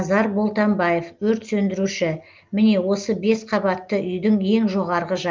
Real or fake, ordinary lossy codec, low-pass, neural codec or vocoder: real; Opus, 32 kbps; 7.2 kHz; none